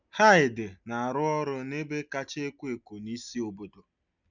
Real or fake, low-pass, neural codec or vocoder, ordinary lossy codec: real; 7.2 kHz; none; none